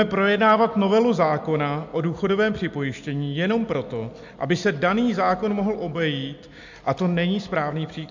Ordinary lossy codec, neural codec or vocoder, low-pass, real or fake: MP3, 64 kbps; none; 7.2 kHz; real